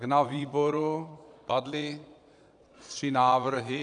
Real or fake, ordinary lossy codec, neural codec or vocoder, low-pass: fake; AAC, 64 kbps; vocoder, 22.05 kHz, 80 mel bands, Vocos; 9.9 kHz